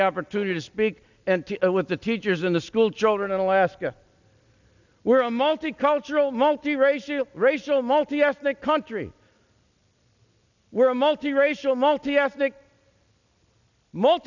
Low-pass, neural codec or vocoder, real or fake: 7.2 kHz; none; real